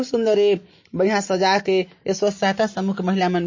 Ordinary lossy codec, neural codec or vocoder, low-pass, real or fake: MP3, 32 kbps; none; 7.2 kHz; real